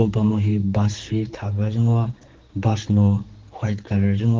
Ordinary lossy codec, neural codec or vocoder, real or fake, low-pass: Opus, 16 kbps; codec, 16 kHz, 4 kbps, X-Codec, HuBERT features, trained on general audio; fake; 7.2 kHz